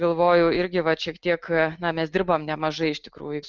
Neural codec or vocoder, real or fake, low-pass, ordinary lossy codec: none; real; 7.2 kHz; Opus, 32 kbps